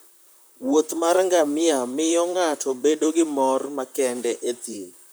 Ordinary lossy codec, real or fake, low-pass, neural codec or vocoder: none; fake; none; vocoder, 44.1 kHz, 128 mel bands, Pupu-Vocoder